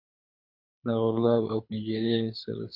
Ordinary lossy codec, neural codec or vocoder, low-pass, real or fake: MP3, 32 kbps; codec, 24 kHz, 6 kbps, HILCodec; 5.4 kHz; fake